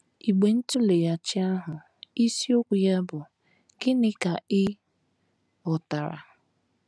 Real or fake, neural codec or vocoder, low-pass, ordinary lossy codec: real; none; none; none